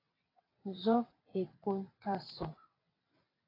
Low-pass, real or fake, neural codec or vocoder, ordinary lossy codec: 5.4 kHz; fake; vocoder, 22.05 kHz, 80 mel bands, WaveNeXt; AAC, 24 kbps